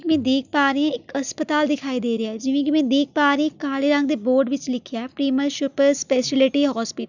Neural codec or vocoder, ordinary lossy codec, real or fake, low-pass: none; none; real; 7.2 kHz